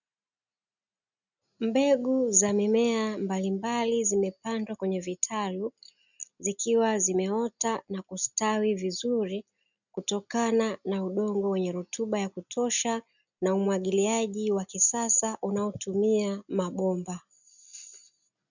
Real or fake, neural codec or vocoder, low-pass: real; none; 7.2 kHz